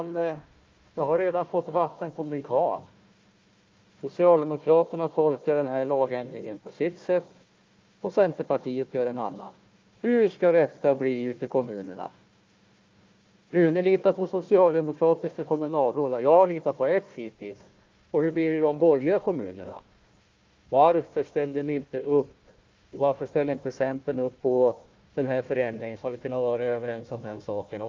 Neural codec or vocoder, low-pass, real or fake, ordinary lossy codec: codec, 16 kHz, 1 kbps, FunCodec, trained on Chinese and English, 50 frames a second; 7.2 kHz; fake; Opus, 24 kbps